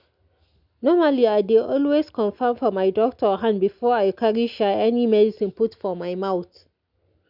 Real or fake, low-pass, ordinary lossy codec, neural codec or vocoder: real; 5.4 kHz; none; none